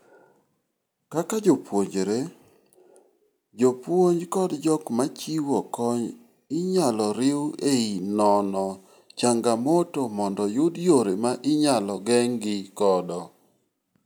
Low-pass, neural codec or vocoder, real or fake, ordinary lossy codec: none; none; real; none